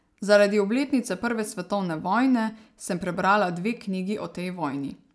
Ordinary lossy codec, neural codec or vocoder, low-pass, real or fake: none; none; none; real